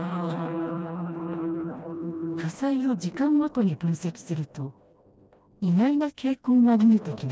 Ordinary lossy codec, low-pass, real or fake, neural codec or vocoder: none; none; fake; codec, 16 kHz, 1 kbps, FreqCodec, smaller model